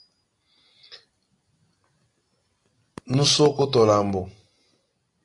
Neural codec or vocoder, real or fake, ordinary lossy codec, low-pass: none; real; AAC, 48 kbps; 10.8 kHz